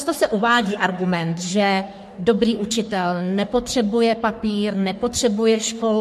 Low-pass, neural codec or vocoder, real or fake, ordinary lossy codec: 14.4 kHz; codec, 44.1 kHz, 3.4 kbps, Pupu-Codec; fake; MP3, 64 kbps